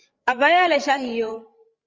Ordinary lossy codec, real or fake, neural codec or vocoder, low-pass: Opus, 24 kbps; fake; codec, 16 kHz, 16 kbps, FreqCodec, larger model; 7.2 kHz